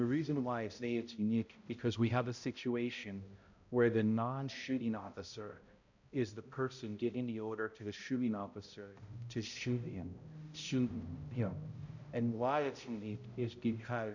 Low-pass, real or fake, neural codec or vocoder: 7.2 kHz; fake; codec, 16 kHz, 0.5 kbps, X-Codec, HuBERT features, trained on balanced general audio